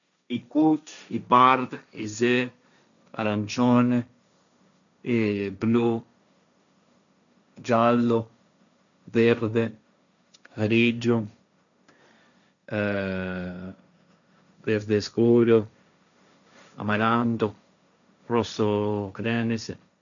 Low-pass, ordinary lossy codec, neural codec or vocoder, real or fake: 7.2 kHz; none; codec, 16 kHz, 1.1 kbps, Voila-Tokenizer; fake